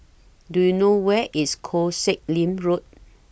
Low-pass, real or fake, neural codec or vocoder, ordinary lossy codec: none; real; none; none